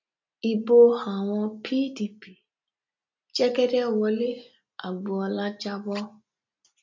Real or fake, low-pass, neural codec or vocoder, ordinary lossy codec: real; 7.2 kHz; none; MP3, 48 kbps